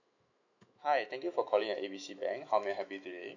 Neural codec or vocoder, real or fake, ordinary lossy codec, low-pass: autoencoder, 48 kHz, 128 numbers a frame, DAC-VAE, trained on Japanese speech; fake; none; 7.2 kHz